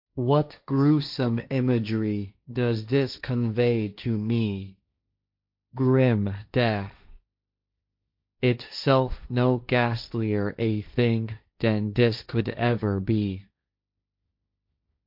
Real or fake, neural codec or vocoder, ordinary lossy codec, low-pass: fake; codec, 16 kHz, 1.1 kbps, Voila-Tokenizer; MP3, 48 kbps; 5.4 kHz